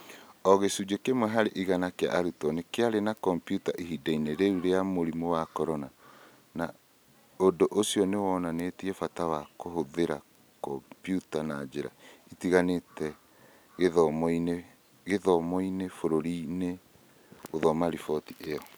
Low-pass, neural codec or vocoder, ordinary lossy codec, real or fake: none; none; none; real